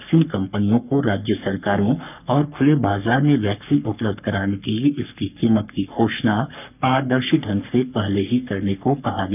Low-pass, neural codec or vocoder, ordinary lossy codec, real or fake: 3.6 kHz; codec, 44.1 kHz, 3.4 kbps, Pupu-Codec; none; fake